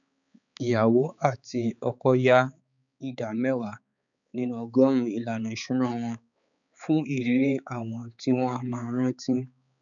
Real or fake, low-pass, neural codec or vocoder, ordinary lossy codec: fake; 7.2 kHz; codec, 16 kHz, 4 kbps, X-Codec, HuBERT features, trained on balanced general audio; none